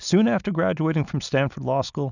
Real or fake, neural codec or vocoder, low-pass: real; none; 7.2 kHz